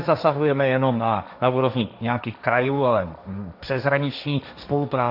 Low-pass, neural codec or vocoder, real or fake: 5.4 kHz; codec, 16 kHz, 1.1 kbps, Voila-Tokenizer; fake